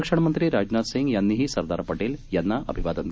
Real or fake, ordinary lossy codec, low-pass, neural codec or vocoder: real; none; none; none